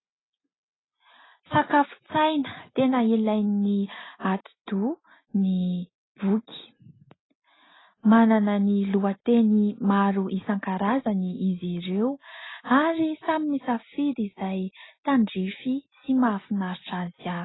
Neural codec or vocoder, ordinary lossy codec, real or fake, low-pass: none; AAC, 16 kbps; real; 7.2 kHz